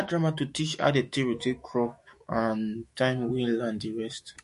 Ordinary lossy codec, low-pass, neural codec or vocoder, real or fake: MP3, 48 kbps; 14.4 kHz; autoencoder, 48 kHz, 128 numbers a frame, DAC-VAE, trained on Japanese speech; fake